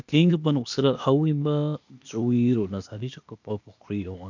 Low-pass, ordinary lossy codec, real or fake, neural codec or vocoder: 7.2 kHz; none; fake; codec, 16 kHz, 0.8 kbps, ZipCodec